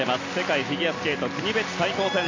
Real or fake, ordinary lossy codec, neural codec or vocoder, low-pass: real; AAC, 32 kbps; none; 7.2 kHz